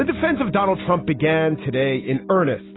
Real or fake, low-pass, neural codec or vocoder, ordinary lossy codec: real; 7.2 kHz; none; AAC, 16 kbps